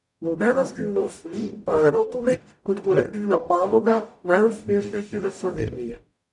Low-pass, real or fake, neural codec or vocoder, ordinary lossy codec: 10.8 kHz; fake; codec, 44.1 kHz, 0.9 kbps, DAC; MP3, 96 kbps